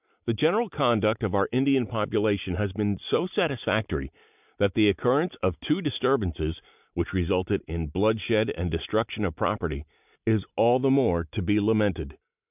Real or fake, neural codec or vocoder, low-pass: real; none; 3.6 kHz